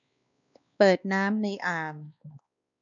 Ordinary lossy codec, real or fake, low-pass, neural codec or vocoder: none; fake; 7.2 kHz; codec, 16 kHz, 2 kbps, X-Codec, WavLM features, trained on Multilingual LibriSpeech